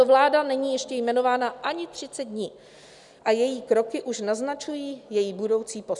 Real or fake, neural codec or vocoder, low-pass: real; none; 10.8 kHz